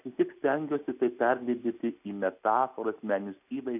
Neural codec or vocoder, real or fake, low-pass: none; real; 3.6 kHz